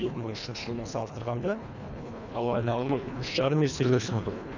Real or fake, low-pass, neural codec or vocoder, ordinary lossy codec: fake; 7.2 kHz; codec, 24 kHz, 1.5 kbps, HILCodec; none